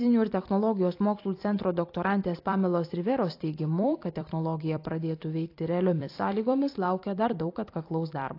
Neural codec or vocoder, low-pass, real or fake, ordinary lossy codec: vocoder, 44.1 kHz, 128 mel bands every 256 samples, BigVGAN v2; 5.4 kHz; fake; AAC, 32 kbps